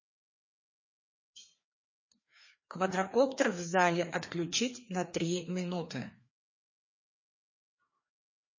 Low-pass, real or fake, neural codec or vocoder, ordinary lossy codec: 7.2 kHz; fake; codec, 16 kHz, 2 kbps, FreqCodec, larger model; MP3, 32 kbps